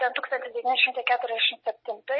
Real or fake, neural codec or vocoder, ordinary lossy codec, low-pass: real; none; MP3, 24 kbps; 7.2 kHz